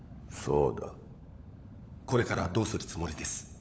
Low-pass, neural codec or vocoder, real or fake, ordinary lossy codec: none; codec, 16 kHz, 16 kbps, FunCodec, trained on LibriTTS, 50 frames a second; fake; none